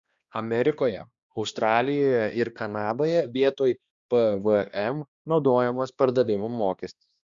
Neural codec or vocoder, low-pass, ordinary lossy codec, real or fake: codec, 16 kHz, 2 kbps, X-Codec, HuBERT features, trained on balanced general audio; 7.2 kHz; Opus, 64 kbps; fake